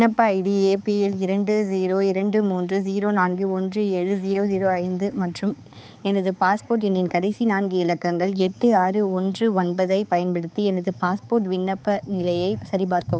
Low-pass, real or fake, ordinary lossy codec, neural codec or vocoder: none; fake; none; codec, 16 kHz, 4 kbps, X-Codec, HuBERT features, trained on balanced general audio